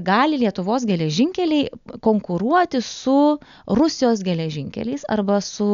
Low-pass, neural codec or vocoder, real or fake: 7.2 kHz; none; real